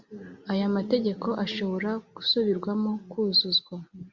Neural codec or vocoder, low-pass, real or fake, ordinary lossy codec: none; 7.2 kHz; real; Opus, 64 kbps